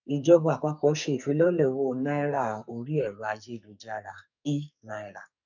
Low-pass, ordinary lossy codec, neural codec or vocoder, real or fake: 7.2 kHz; none; codec, 44.1 kHz, 2.6 kbps, SNAC; fake